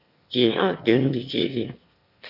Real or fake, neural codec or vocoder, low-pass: fake; autoencoder, 22.05 kHz, a latent of 192 numbers a frame, VITS, trained on one speaker; 5.4 kHz